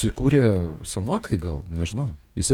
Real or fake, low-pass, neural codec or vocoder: fake; 19.8 kHz; codec, 44.1 kHz, 2.6 kbps, DAC